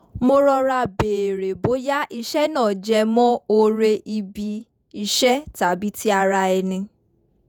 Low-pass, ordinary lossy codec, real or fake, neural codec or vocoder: none; none; fake; vocoder, 48 kHz, 128 mel bands, Vocos